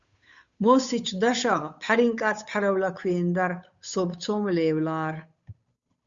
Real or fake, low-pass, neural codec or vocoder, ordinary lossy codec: fake; 7.2 kHz; codec, 16 kHz, 8 kbps, FunCodec, trained on Chinese and English, 25 frames a second; Opus, 64 kbps